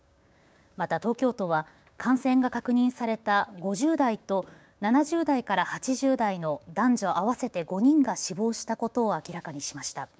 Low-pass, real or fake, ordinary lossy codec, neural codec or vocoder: none; fake; none; codec, 16 kHz, 6 kbps, DAC